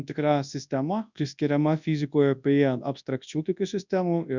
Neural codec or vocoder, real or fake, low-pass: codec, 24 kHz, 0.9 kbps, WavTokenizer, large speech release; fake; 7.2 kHz